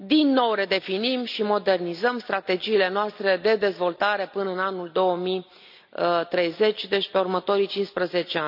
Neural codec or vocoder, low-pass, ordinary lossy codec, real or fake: none; 5.4 kHz; none; real